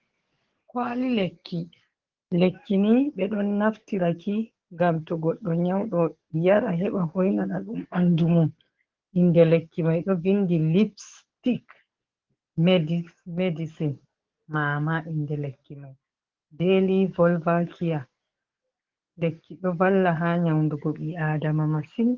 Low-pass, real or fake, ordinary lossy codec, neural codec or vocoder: 7.2 kHz; fake; Opus, 16 kbps; codec, 44.1 kHz, 7.8 kbps, DAC